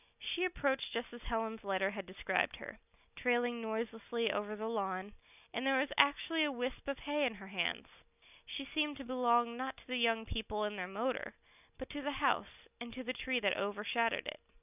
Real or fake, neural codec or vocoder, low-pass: real; none; 3.6 kHz